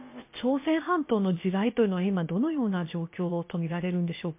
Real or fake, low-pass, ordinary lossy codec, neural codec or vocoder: fake; 3.6 kHz; MP3, 24 kbps; codec, 16 kHz, about 1 kbps, DyCAST, with the encoder's durations